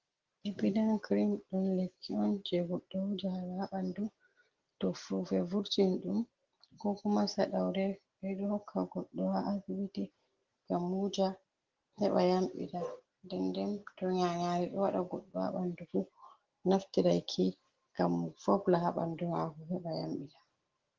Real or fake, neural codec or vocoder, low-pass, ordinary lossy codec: real; none; 7.2 kHz; Opus, 16 kbps